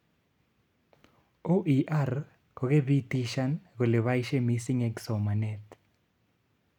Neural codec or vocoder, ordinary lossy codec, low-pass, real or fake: none; none; 19.8 kHz; real